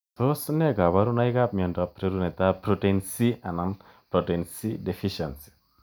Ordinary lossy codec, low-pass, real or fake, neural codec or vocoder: none; none; real; none